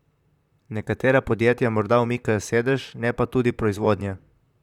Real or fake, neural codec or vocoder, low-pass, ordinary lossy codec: fake; vocoder, 44.1 kHz, 128 mel bands, Pupu-Vocoder; 19.8 kHz; none